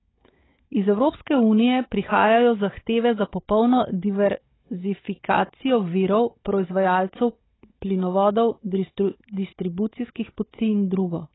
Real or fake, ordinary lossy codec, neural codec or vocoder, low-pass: fake; AAC, 16 kbps; codec, 16 kHz, 16 kbps, FunCodec, trained on Chinese and English, 50 frames a second; 7.2 kHz